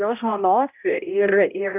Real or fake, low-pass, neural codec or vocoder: fake; 3.6 kHz; codec, 16 kHz, 0.5 kbps, X-Codec, HuBERT features, trained on balanced general audio